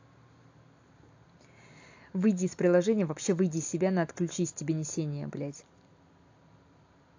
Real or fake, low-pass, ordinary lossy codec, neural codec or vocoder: real; 7.2 kHz; AAC, 48 kbps; none